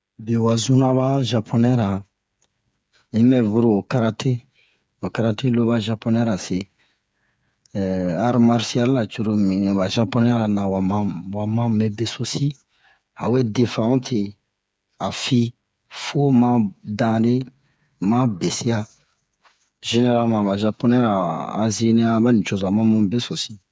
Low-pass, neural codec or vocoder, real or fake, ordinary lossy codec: none; codec, 16 kHz, 8 kbps, FreqCodec, smaller model; fake; none